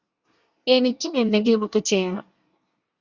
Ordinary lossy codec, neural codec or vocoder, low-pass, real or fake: Opus, 64 kbps; codec, 24 kHz, 1 kbps, SNAC; 7.2 kHz; fake